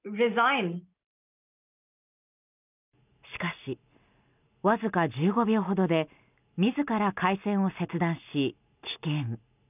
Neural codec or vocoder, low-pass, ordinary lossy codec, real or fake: none; 3.6 kHz; none; real